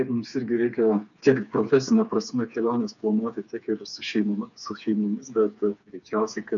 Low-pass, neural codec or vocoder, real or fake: 7.2 kHz; codec, 16 kHz, 4 kbps, FreqCodec, smaller model; fake